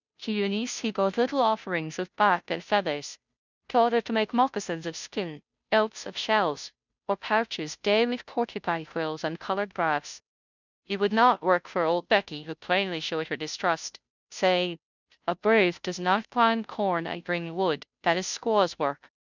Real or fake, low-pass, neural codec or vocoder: fake; 7.2 kHz; codec, 16 kHz, 0.5 kbps, FunCodec, trained on Chinese and English, 25 frames a second